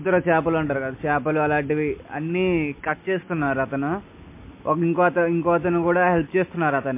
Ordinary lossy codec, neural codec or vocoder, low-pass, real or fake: MP3, 24 kbps; none; 3.6 kHz; real